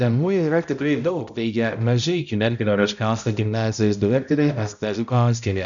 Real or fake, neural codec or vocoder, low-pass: fake; codec, 16 kHz, 0.5 kbps, X-Codec, HuBERT features, trained on balanced general audio; 7.2 kHz